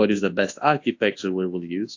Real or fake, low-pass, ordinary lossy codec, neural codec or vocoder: fake; 7.2 kHz; AAC, 48 kbps; codec, 24 kHz, 0.9 kbps, WavTokenizer, large speech release